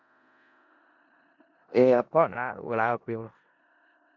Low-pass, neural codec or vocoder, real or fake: 7.2 kHz; codec, 16 kHz in and 24 kHz out, 0.4 kbps, LongCat-Audio-Codec, four codebook decoder; fake